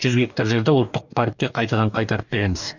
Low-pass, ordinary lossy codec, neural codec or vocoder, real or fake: 7.2 kHz; none; codec, 44.1 kHz, 2.6 kbps, DAC; fake